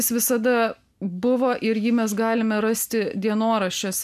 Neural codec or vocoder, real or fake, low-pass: none; real; 14.4 kHz